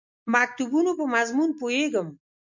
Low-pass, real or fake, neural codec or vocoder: 7.2 kHz; real; none